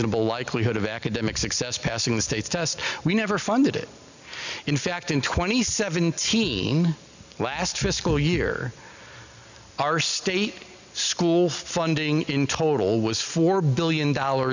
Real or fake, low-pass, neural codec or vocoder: real; 7.2 kHz; none